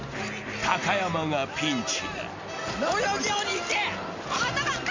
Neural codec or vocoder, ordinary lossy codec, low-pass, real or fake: none; MP3, 64 kbps; 7.2 kHz; real